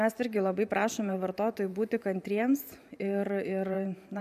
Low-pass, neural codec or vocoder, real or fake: 14.4 kHz; vocoder, 44.1 kHz, 128 mel bands every 512 samples, BigVGAN v2; fake